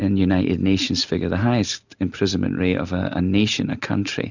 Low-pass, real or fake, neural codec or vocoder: 7.2 kHz; real; none